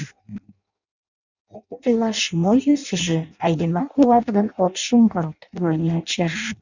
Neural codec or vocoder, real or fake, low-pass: codec, 16 kHz in and 24 kHz out, 0.6 kbps, FireRedTTS-2 codec; fake; 7.2 kHz